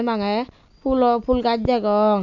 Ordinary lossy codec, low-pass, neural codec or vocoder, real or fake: none; 7.2 kHz; none; real